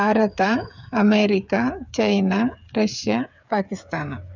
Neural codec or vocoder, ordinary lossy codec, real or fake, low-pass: codec, 16 kHz, 8 kbps, FreqCodec, smaller model; none; fake; 7.2 kHz